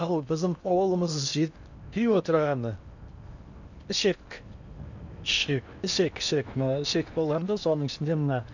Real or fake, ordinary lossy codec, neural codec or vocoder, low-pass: fake; none; codec, 16 kHz in and 24 kHz out, 0.8 kbps, FocalCodec, streaming, 65536 codes; 7.2 kHz